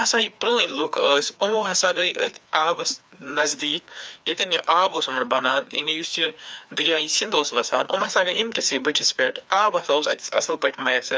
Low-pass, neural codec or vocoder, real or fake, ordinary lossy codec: none; codec, 16 kHz, 2 kbps, FreqCodec, larger model; fake; none